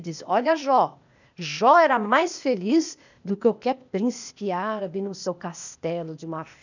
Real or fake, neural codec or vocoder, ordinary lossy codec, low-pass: fake; codec, 16 kHz, 0.8 kbps, ZipCodec; none; 7.2 kHz